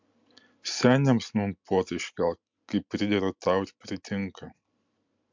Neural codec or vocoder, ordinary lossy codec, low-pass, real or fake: none; MP3, 64 kbps; 7.2 kHz; real